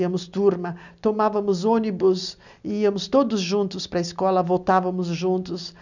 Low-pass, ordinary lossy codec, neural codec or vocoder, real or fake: 7.2 kHz; none; none; real